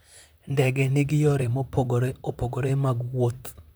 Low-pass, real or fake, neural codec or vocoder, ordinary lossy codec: none; fake; vocoder, 44.1 kHz, 128 mel bands, Pupu-Vocoder; none